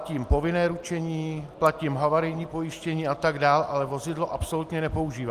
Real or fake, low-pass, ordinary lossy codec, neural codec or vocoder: real; 14.4 kHz; Opus, 24 kbps; none